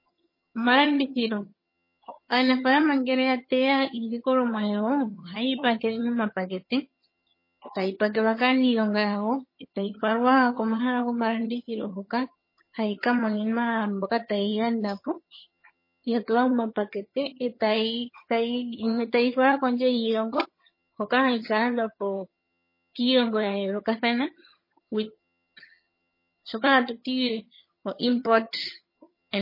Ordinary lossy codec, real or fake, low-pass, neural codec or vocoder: MP3, 24 kbps; fake; 5.4 kHz; vocoder, 22.05 kHz, 80 mel bands, HiFi-GAN